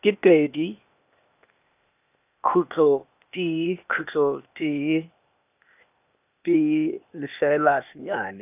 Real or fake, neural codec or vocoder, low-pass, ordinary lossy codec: fake; codec, 16 kHz, 0.8 kbps, ZipCodec; 3.6 kHz; none